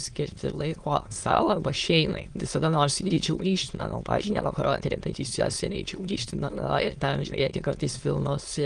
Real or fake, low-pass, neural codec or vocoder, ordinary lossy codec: fake; 9.9 kHz; autoencoder, 22.05 kHz, a latent of 192 numbers a frame, VITS, trained on many speakers; Opus, 24 kbps